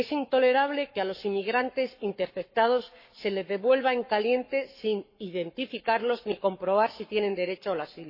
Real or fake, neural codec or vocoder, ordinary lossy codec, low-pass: fake; codec, 44.1 kHz, 7.8 kbps, Pupu-Codec; MP3, 24 kbps; 5.4 kHz